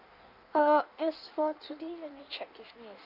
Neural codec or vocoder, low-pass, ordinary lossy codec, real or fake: codec, 16 kHz in and 24 kHz out, 1.1 kbps, FireRedTTS-2 codec; 5.4 kHz; none; fake